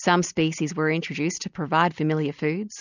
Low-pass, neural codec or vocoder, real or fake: 7.2 kHz; none; real